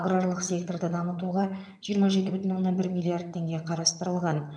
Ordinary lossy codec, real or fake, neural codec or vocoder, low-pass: none; fake; vocoder, 22.05 kHz, 80 mel bands, HiFi-GAN; none